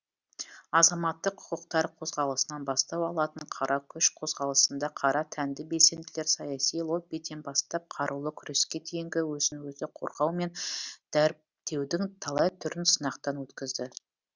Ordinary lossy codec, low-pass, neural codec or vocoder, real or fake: Opus, 64 kbps; 7.2 kHz; none; real